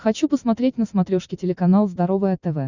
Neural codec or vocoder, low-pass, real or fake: none; 7.2 kHz; real